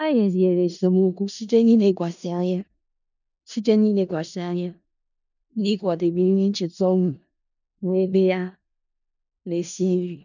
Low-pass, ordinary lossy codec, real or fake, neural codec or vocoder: 7.2 kHz; none; fake; codec, 16 kHz in and 24 kHz out, 0.4 kbps, LongCat-Audio-Codec, four codebook decoder